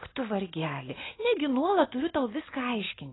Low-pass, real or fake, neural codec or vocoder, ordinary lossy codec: 7.2 kHz; real; none; AAC, 16 kbps